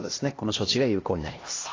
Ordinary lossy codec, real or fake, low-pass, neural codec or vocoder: AAC, 32 kbps; fake; 7.2 kHz; codec, 16 kHz, 1 kbps, X-Codec, HuBERT features, trained on LibriSpeech